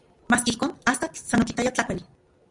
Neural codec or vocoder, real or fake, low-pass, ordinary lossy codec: none; real; 10.8 kHz; Opus, 64 kbps